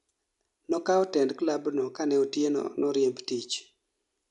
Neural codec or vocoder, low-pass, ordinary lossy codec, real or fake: none; 10.8 kHz; none; real